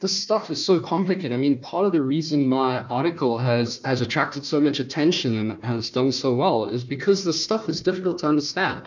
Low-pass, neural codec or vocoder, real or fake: 7.2 kHz; codec, 16 kHz, 1 kbps, FunCodec, trained on Chinese and English, 50 frames a second; fake